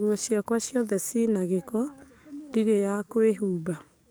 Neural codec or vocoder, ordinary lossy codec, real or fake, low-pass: codec, 44.1 kHz, 7.8 kbps, DAC; none; fake; none